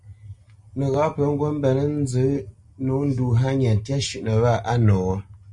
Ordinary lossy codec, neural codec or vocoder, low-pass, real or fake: MP3, 48 kbps; none; 10.8 kHz; real